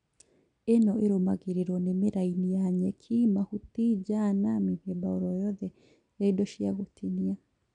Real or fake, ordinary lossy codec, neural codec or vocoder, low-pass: real; MP3, 96 kbps; none; 9.9 kHz